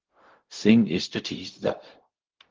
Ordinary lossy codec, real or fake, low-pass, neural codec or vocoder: Opus, 16 kbps; fake; 7.2 kHz; codec, 16 kHz, 0.4 kbps, LongCat-Audio-Codec